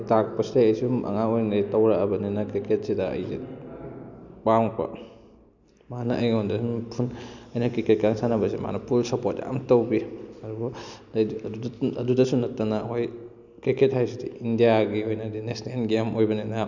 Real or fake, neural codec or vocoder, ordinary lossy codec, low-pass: real; none; none; 7.2 kHz